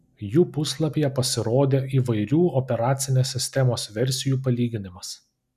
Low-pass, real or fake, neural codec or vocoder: 14.4 kHz; real; none